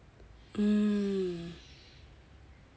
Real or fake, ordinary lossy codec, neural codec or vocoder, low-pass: real; none; none; none